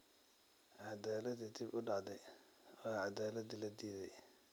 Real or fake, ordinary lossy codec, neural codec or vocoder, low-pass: real; none; none; none